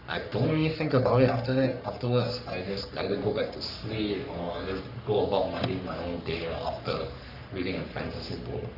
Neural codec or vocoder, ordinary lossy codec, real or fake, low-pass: codec, 44.1 kHz, 3.4 kbps, Pupu-Codec; none; fake; 5.4 kHz